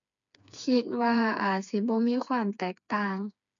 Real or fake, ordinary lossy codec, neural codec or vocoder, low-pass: fake; none; codec, 16 kHz, 4 kbps, FreqCodec, smaller model; 7.2 kHz